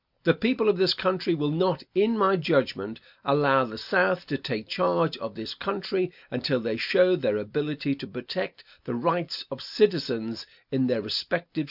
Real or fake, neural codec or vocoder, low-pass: real; none; 5.4 kHz